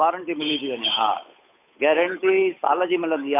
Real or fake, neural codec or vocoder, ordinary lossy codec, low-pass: fake; codec, 16 kHz, 8 kbps, FunCodec, trained on Chinese and English, 25 frames a second; AAC, 24 kbps; 3.6 kHz